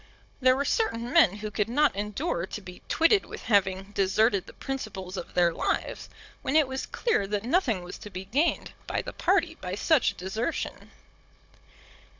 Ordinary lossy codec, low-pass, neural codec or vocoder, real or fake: MP3, 64 kbps; 7.2 kHz; codec, 16 kHz, 16 kbps, FunCodec, trained on Chinese and English, 50 frames a second; fake